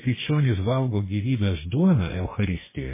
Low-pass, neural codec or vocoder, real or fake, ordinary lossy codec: 3.6 kHz; codec, 44.1 kHz, 2.6 kbps, DAC; fake; MP3, 16 kbps